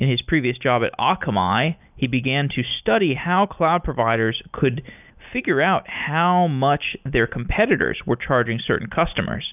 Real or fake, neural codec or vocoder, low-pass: real; none; 3.6 kHz